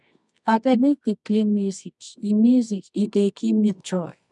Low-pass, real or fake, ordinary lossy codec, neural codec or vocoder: 10.8 kHz; fake; none; codec, 24 kHz, 0.9 kbps, WavTokenizer, medium music audio release